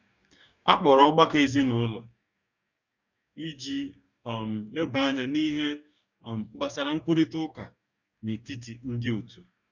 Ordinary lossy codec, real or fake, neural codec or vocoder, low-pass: none; fake; codec, 44.1 kHz, 2.6 kbps, DAC; 7.2 kHz